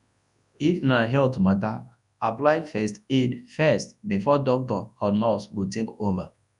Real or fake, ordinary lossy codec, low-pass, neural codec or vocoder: fake; none; 10.8 kHz; codec, 24 kHz, 0.9 kbps, WavTokenizer, large speech release